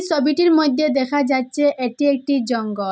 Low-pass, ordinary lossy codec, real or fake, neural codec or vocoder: none; none; real; none